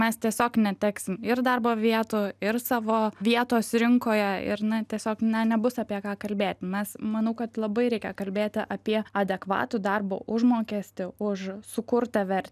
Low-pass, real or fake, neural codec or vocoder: 14.4 kHz; real; none